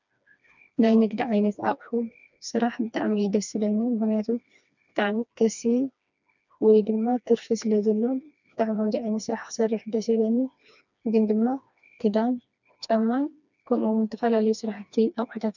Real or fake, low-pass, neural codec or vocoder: fake; 7.2 kHz; codec, 16 kHz, 2 kbps, FreqCodec, smaller model